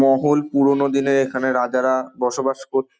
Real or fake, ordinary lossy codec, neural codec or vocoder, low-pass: real; none; none; none